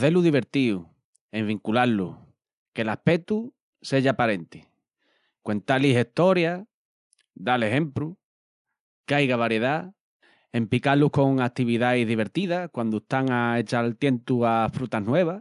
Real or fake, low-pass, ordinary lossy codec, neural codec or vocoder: real; 10.8 kHz; none; none